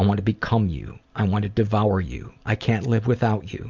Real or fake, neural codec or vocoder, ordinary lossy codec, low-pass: real; none; Opus, 64 kbps; 7.2 kHz